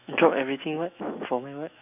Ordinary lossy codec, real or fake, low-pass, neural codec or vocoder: none; fake; 3.6 kHz; autoencoder, 48 kHz, 128 numbers a frame, DAC-VAE, trained on Japanese speech